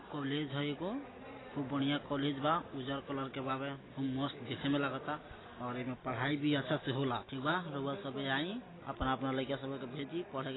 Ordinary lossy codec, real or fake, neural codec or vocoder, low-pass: AAC, 16 kbps; real; none; 7.2 kHz